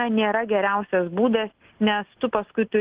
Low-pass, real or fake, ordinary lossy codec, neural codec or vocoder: 3.6 kHz; real; Opus, 16 kbps; none